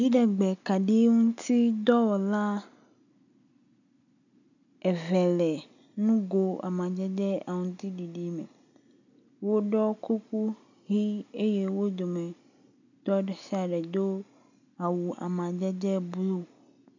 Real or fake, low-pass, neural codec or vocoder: real; 7.2 kHz; none